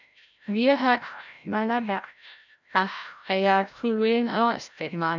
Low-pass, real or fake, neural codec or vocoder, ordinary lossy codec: 7.2 kHz; fake; codec, 16 kHz, 0.5 kbps, FreqCodec, larger model; none